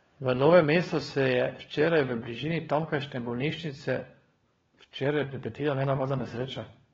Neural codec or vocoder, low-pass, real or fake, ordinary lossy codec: codec, 16 kHz, 4 kbps, FunCodec, trained on LibriTTS, 50 frames a second; 7.2 kHz; fake; AAC, 24 kbps